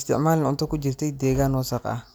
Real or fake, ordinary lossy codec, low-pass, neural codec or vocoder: real; none; none; none